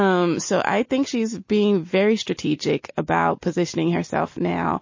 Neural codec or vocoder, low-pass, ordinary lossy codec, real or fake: none; 7.2 kHz; MP3, 32 kbps; real